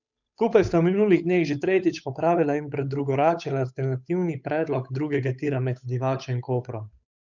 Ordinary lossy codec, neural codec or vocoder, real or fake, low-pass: none; codec, 16 kHz, 8 kbps, FunCodec, trained on Chinese and English, 25 frames a second; fake; 7.2 kHz